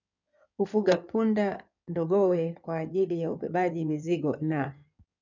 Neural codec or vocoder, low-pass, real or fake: codec, 16 kHz in and 24 kHz out, 2.2 kbps, FireRedTTS-2 codec; 7.2 kHz; fake